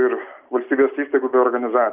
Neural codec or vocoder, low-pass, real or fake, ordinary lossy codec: none; 3.6 kHz; real; Opus, 32 kbps